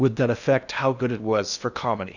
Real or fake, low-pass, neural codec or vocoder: fake; 7.2 kHz; codec, 16 kHz in and 24 kHz out, 0.8 kbps, FocalCodec, streaming, 65536 codes